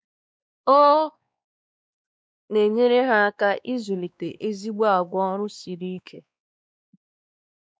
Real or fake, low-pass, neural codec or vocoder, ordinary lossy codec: fake; none; codec, 16 kHz, 2 kbps, X-Codec, WavLM features, trained on Multilingual LibriSpeech; none